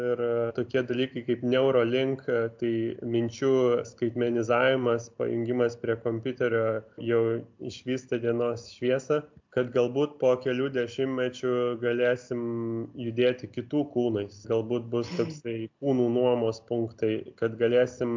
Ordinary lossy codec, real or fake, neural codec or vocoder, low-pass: MP3, 64 kbps; real; none; 7.2 kHz